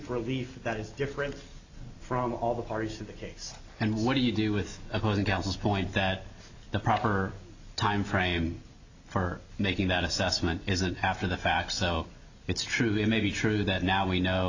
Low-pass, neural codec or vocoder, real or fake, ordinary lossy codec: 7.2 kHz; none; real; AAC, 48 kbps